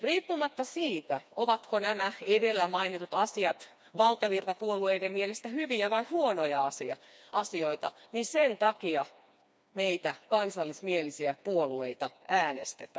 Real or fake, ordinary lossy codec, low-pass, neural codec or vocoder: fake; none; none; codec, 16 kHz, 2 kbps, FreqCodec, smaller model